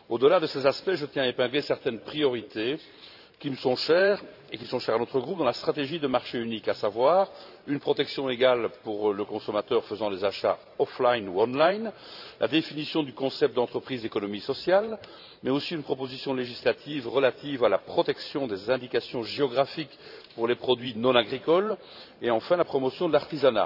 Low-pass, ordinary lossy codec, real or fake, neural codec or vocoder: 5.4 kHz; none; fake; vocoder, 44.1 kHz, 128 mel bands every 512 samples, BigVGAN v2